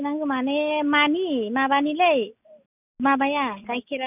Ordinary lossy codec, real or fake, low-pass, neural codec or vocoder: none; real; 3.6 kHz; none